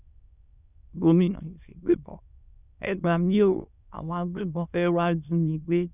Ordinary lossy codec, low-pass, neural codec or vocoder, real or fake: none; 3.6 kHz; autoencoder, 22.05 kHz, a latent of 192 numbers a frame, VITS, trained on many speakers; fake